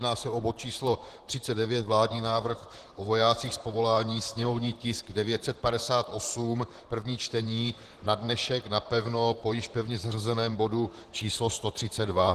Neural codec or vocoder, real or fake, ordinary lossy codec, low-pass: vocoder, 44.1 kHz, 128 mel bands, Pupu-Vocoder; fake; Opus, 16 kbps; 14.4 kHz